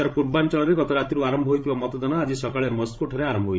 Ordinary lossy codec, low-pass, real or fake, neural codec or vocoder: none; none; fake; codec, 16 kHz, 16 kbps, FreqCodec, larger model